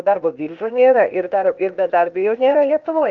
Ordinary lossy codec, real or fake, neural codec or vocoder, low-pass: Opus, 24 kbps; fake; codec, 16 kHz, 0.8 kbps, ZipCodec; 7.2 kHz